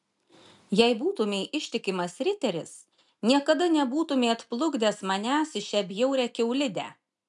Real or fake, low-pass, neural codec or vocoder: real; 10.8 kHz; none